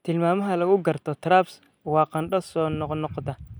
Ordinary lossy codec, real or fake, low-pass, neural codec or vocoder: none; real; none; none